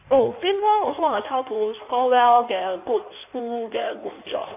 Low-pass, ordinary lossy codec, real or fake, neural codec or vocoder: 3.6 kHz; none; fake; codec, 16 kHz in and 24 kHz out, 1.1 kbps, FireRedTTS-2 codec